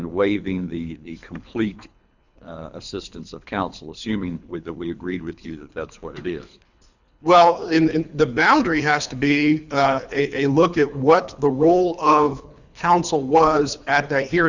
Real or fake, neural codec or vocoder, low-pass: fake; codec, 24 kHz, 3 kbps, HILCodec; 7.2 kHz